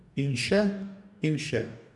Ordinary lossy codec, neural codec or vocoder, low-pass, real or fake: none; codec, 44.1 kHz, 2.6 kbps, DAC; 10.8 kHz; fake